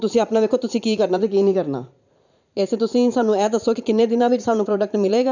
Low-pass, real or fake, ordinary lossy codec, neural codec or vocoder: 7.2 kHz; fake; none; vocoder, 44.1 kHz, 80 mel bands, Vocos